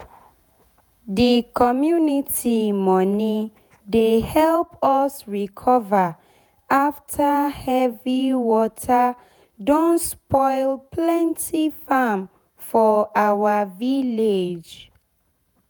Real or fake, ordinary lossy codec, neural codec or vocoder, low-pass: fake; none; vocoder, 48 kHz, 128 mel bands, Vocos; none